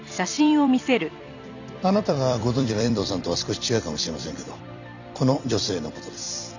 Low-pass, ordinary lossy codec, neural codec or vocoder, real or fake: 7.2 kHz; none; vocoder, 44.1 kHz, 128 mel bands every 256 samples, BigVGAN v2; fake